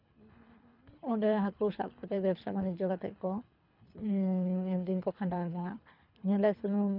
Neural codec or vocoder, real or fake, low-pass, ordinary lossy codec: codec, 24 kHz, 3 kbps, HILCodec; fake; 5.4 kHz; none